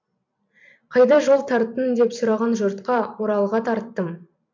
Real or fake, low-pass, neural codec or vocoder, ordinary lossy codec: fake; 7.2 kHz; vocoder, 44.1 kHz, 128 mel bands every 256 samples, BigVGAN v2; MP3, 64 kbps